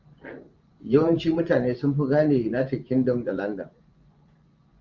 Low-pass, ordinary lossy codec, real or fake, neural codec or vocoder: 7.2 kHz; Opus, 32 kbps; fake; vocoder, 24 kHz, 100 mel bands, Vocos